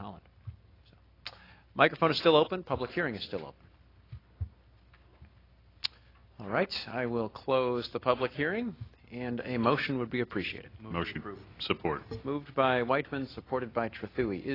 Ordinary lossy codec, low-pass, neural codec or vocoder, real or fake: AAC, 24 kbps; 5.4 kHz; none; real